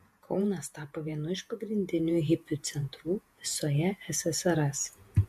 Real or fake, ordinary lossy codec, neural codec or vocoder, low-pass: fake; MP3, 64 kbps; vocoder, 48 kHz, 128 mel bands, Vocos; 14.4 kHz